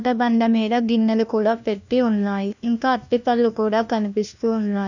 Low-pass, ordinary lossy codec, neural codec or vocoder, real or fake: 7.2 kHz; none; codec, 16 kHz, 1 kbps, FunCodec, trained on LibriTTS, 50 frames a second; fake